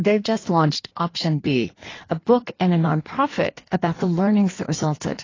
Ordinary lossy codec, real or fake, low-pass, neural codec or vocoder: AAC, 32 kbps; fake; 7.2 kHz; codec, 16 kHz in and 24 kHz out, 1.1 kbps, FireRedTTS-2 codec